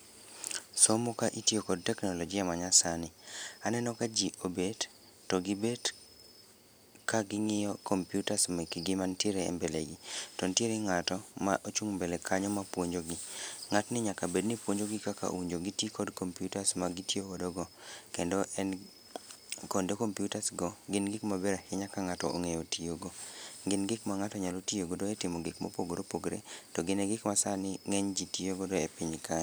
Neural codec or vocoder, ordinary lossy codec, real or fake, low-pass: none; none; real; none